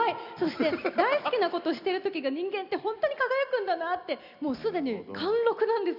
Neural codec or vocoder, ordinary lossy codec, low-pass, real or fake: none; none; 5.4 kHz; real